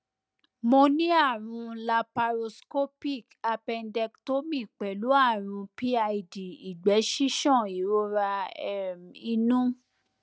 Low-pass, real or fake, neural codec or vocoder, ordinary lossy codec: none; real; none; none